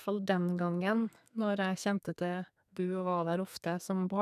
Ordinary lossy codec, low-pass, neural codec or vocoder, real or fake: none; 14.4 kHz; codec, 32 kHz, 1.9 kbps, SNAC; fake